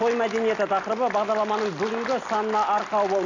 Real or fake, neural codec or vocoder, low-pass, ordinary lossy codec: real; none; 7.2 kHz; none